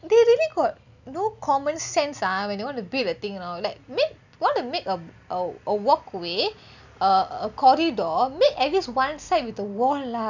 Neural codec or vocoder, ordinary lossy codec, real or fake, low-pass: none; none; real; 7.2 kHz